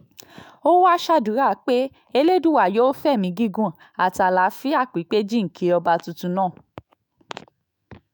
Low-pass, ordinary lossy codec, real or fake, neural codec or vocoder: none; none; fake; autoencoder, 48 kHz, 128 numbers a frame, DAC-VAE, trained on Japanese speech